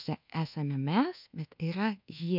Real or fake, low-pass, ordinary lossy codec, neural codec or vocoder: fake; 5.4 kHz; MP3, 48 kbps; codec, 24 kHz, 1.2 kbps, DualCodec